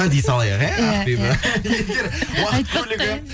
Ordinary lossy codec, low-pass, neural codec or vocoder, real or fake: none; none; none; real